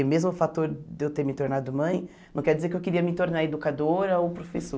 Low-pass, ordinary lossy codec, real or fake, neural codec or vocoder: none; none; real; none